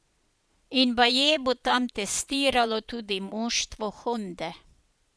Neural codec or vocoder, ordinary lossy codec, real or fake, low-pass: vocoder, 22.05 kHz, 80 mel bands, Vocos; none; fake; none